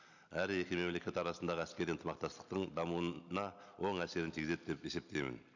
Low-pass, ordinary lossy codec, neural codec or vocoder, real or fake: 7.2 kHz; none; none; real